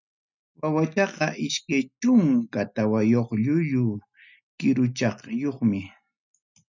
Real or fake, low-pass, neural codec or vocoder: real; 7.2 kHz; none